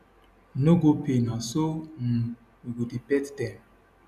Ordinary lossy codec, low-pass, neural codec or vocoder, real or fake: none; 14.4 kHz; none; real